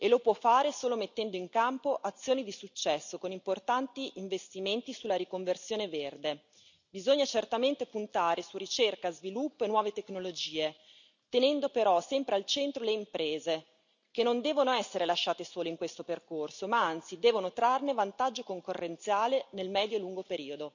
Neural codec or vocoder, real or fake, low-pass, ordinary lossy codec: none; real; 7.2 kHz; none